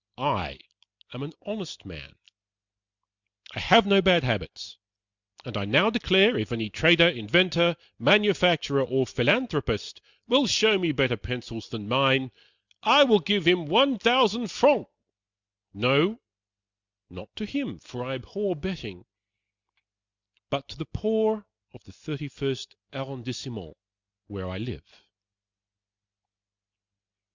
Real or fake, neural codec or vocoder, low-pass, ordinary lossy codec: real; none; 7.2 kHz; Opus, 64 kbps